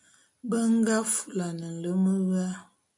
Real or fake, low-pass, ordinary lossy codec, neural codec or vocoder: real; 10.8 kHz; MP3, 96 kbps; none